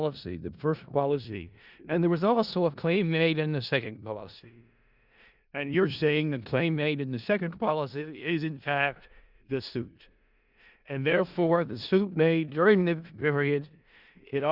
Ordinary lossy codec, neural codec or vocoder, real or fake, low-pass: Opus, 64 kbps; codec, 16 kHz in and 24 kHz out, 0.4 kbps, LongCat-Audio-Codec, four codebook decoder; fake; 5.4 kHz